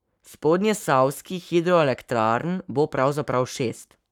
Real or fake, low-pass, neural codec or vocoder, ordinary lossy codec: fake; 19.8 kHz; codec, 44.1 kHz, 7.8 kbps, Pupu-Codec; none